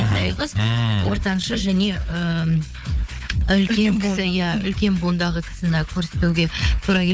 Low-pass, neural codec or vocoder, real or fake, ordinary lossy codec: none; codec, 16 kHz, 4 kbps, FunCodec, trained on Chinese and English, 50 frames a second; fake; none